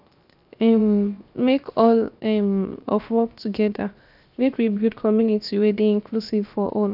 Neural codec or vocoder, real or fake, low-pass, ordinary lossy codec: codec, 16 kHz, 0.7 kbps, FocalCodec; fake; 5.4 kHz; none